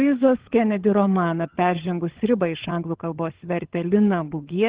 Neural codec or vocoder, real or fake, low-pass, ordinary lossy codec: codec, 16 kHz, 16 kbps, FunCodec, trained on LibriTTS, 50 frames a second; fake; 3.6 kHz; Opus, 16 kbps